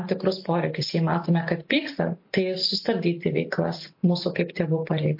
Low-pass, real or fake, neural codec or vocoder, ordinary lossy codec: 5.4 kHz; real; none; MP3, 32 kbps